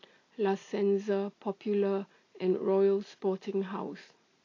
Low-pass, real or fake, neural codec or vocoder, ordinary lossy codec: 7.2 kHz; real; none; AAC, 32 kbps